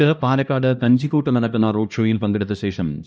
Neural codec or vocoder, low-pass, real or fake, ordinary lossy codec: codec, 16 kHz, 1 kbps, X-Codec, HuBERT features, trained on LibriSpeech; none; fake; none